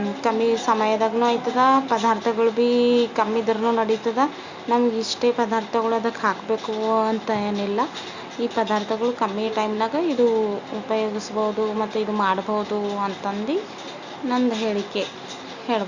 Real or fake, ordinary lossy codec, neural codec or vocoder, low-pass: real; Opus, 64 kbps; none; 7.2 kHz